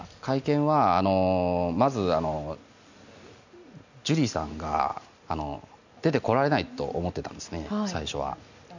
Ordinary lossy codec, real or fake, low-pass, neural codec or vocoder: none; real; 7.2 kHz; none